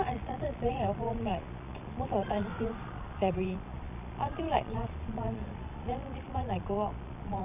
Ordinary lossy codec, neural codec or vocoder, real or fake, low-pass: none; vocoder, 22.05 kHz, 80 mel bands, Vocos; fake; 3.6 kHz